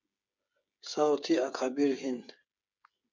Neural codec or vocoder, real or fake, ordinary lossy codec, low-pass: codec, 16 kHz, 8 kbps, FreqCodec, smaller model; fake; MP3, 64 kbps; 7.2 kHz